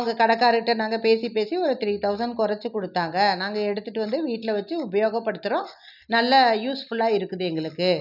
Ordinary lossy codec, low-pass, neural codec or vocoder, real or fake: none; 5.4 kHz; none; real